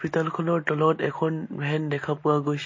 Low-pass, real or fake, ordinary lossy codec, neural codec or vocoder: 7.2 kHz; real; MP3, 32 kbps; none